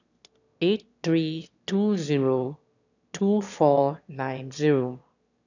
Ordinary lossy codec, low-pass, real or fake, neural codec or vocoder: none; 7.2 kHz; fake; autoencoder, 22.05 kHz, a latent of 192 numbers a frame, VITS, trained on one speaker